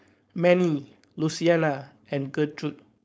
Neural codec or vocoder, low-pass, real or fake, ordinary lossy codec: codec, 16 kHz, 4.8 kbps, FACodec; none; fake; none